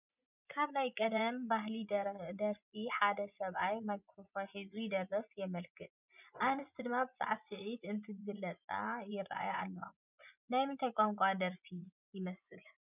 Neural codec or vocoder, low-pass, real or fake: vocoder, 24 kHz, 100 mel bands, Vocos; 3.6 kHz; fake